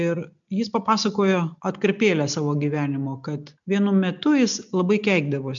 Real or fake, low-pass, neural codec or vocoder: real; 7.2 kHz; none